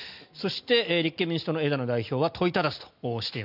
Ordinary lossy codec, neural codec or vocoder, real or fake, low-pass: none; none; real; 5.4 kHz